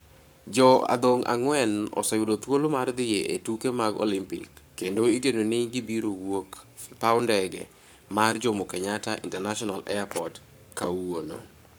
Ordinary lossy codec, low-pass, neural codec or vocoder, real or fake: none; none; codec, 44.1 kHz, 7.8 kbps, Pupu-Codec; fake